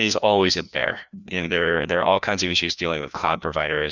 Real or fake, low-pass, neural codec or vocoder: fake; 7.2 kHz; codec, 16 kHz, 1 kbps, FreqCodec, larger model